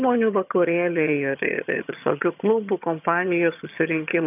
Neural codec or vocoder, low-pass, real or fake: vocoder, 22.05 kHz, 80 mel bands, HiFi-GAN; 3.6 kHz; fake